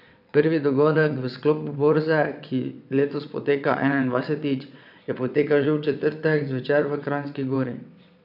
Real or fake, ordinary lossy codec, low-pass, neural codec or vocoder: fake; none; 5.4 kHz; vocoder, 22.05 kHz, 80 mel bands, WaveNeXt